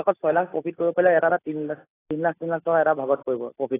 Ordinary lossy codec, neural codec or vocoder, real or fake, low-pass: AAC, 16 kbps; none; real; 3.6 kHz